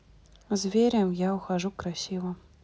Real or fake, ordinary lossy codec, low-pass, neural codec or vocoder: real; none; none; none